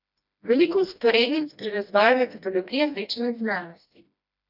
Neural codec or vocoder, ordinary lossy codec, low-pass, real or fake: codec, 16 kHz, 1 kbps, FreqCodec, smaller model; none; 5.4 kHz; fake